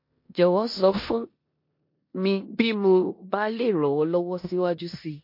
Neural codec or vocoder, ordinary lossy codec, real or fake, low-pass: codec, 16 kHz in and 24 kHz out, 0.9 kbps, LongCat-Audio-Codec, four codebook decoder; MP3, 32 kbps; fake; 5.4 kHz